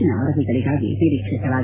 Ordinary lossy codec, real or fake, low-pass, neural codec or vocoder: AAC, 16 kbps; real; 3.6 kHz; none